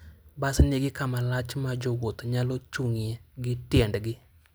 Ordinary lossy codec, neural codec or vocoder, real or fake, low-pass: none; none; real; none